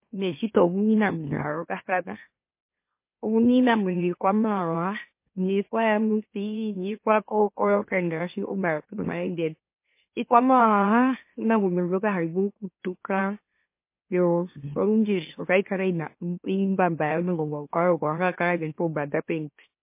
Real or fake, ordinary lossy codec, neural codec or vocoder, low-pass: fake; MP3, 24 kbps; autoencoder, 44.1 kHz, a latent of 192 numbers a frame, MeloTTS; 3.6 kHz